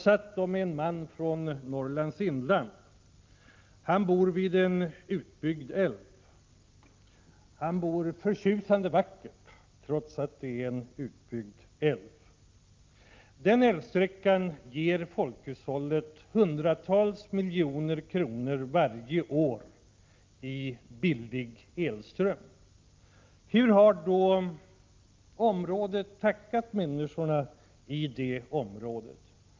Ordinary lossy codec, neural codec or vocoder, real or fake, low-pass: Opus, 24 kbps; none; real; 7.2 kHz